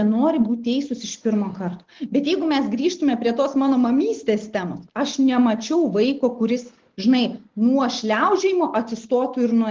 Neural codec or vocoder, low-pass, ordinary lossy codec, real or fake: none; 7.2 kHz; Opus, 16 kbps; real